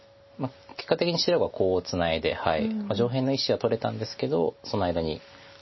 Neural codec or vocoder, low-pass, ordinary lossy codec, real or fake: none; 7.2 kHz; MP3, 24 kbps; real